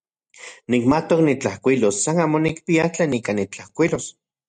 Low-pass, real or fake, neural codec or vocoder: 9.9 kHz; real; none